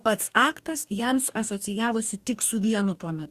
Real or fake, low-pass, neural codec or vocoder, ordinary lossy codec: fake; 14.4 kHz; codec, 44.1 kHz, 2.6 kbps, DAC; Opus, 64 kbps